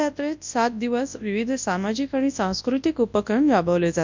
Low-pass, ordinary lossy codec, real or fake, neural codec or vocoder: 7.2 kHz; MP3, 64 kbps; fake; codec, 24 kHz, 0.9 kbps, WavTokenizer, large speech release